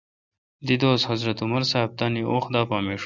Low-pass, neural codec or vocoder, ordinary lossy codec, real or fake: 7.2 kHz; none; Opus, 64 kbps; real